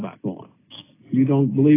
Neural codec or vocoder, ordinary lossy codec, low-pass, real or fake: none; AAC, 16 kbps; 3.6 kHz; real